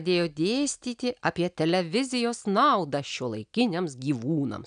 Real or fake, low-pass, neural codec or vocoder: real; 9.9 kHz; none